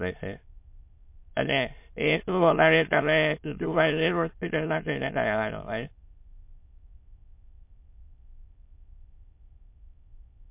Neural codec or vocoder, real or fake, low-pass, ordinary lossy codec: autoencoder, 22.05 kHz, a latent of 192 numbers a frame, VITS, trained on many speakers; fake; 3.6 kHz; MP3, 32 kbps